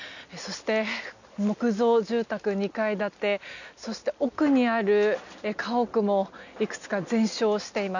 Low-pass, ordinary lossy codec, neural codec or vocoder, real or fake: 7.2 kHz; none; none; real